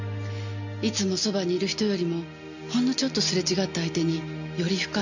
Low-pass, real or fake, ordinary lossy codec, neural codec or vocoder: 7.2 kHz; real; none; none